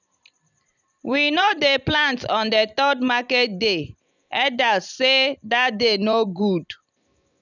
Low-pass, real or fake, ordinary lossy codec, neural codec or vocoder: 7.2 kHz; fake; none; vocoder, 44.1 kHz, 128 mel bands every 256 samples, BigVGAN v2